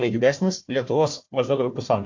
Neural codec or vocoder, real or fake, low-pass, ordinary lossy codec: codec, 16 kHz, 1 kbps, FunCodec, trained on Chinese and English, 50 frames a second; fake; 7.2 kHz; MP3, 48 kbps